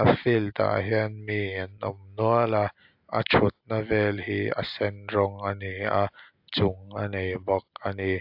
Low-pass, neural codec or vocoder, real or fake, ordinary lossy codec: 5.4 kHz; none; real; AAC, 48 kbps